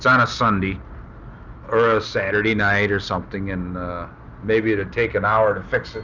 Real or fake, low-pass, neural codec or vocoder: real; 7.2 kHz; none